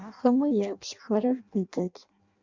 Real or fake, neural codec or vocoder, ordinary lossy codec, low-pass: fake; codec, 16 kHz in and 24 kHz out, 0.6 kbps, FireRedTTS-2 codec; Opus, 64 kbps; 7.2 kHz